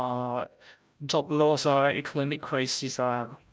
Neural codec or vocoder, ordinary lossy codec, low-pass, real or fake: codec, 16 kHz, 0.5 kbps, FreqCodec, larger model; none; none; fake